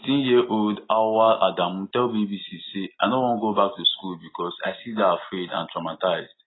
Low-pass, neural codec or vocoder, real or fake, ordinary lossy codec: 7.2 kHz; none; real; AAC, 16 kbps